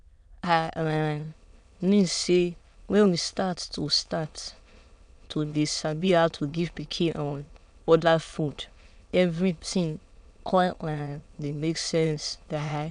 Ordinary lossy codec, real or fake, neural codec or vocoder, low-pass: none; fake; autoencoder, 22.05 kHz, a latent of 192 numbers a frame, VITS, trained on many speakers; 9.9 kHz